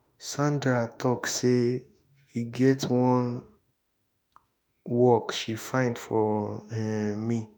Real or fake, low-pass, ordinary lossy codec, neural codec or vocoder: fake; none; none; autoencoder, 48 kHz, 32 numbers a frame, DAC-VAE, trained on Japanese speech